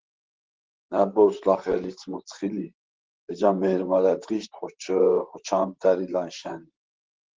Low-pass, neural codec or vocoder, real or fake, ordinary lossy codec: 7.2 kHz; vocoder, 44.1 kHz, 128 mel bands, Pupu-Vocoder; fake; Opus, 16 kbps